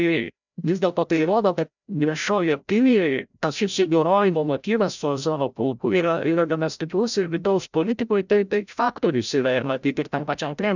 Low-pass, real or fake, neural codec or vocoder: 7.2 kHz; fake; codec, 16 kHz, 0.5 kbps, FreqCodec, larger model